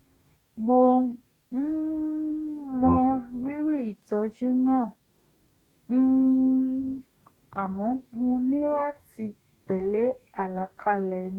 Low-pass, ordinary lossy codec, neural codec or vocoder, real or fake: 19.8 kHz; Opus, 64 kbps; codec, 44.1 kHz, 2.6 kbps, DAC; fake